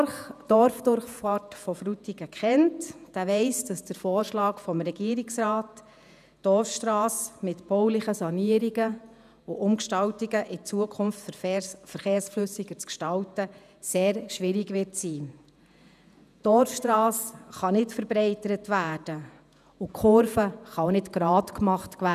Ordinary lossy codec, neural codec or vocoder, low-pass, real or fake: none; vocoder, 48 kHz, 128 mel bands, Vocos; 14.4 kHz; fake